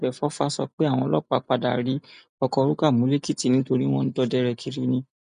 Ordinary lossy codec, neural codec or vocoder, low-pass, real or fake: none; none; 9.9 kHz; real